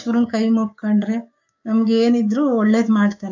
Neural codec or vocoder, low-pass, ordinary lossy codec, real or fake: codec, 16 kHz, 6 kbps, DAC; 7.2 kHz; AAC, 48 kbps; fake